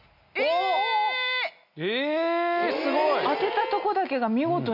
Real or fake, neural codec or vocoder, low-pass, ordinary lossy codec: real; none; 5.4 kHz; none